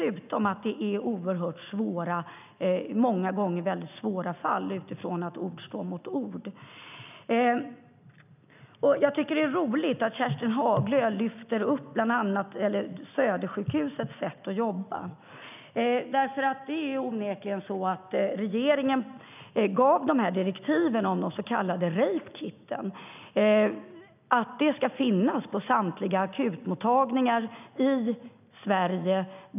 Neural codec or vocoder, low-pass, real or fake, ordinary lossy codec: none; 3.6 kHz; real; none